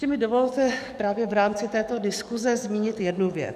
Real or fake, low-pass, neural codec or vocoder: fake; 14.4 kHz; codec, 44.1 kHz, 7.8 kbps, DAC